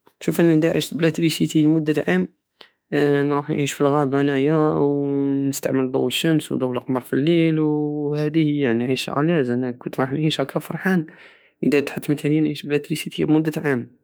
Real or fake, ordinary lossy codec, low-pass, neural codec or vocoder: fake; none; none; autoencoder, 48 kHz, 32 numbers a frame, DAC-VAE, trained on Japanese speech